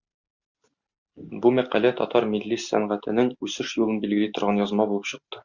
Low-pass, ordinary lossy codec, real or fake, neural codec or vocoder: 7.2 kHz; Opus, 64 kbps; real; none